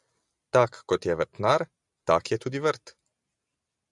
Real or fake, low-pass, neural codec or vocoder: real; 10.8 kHz; none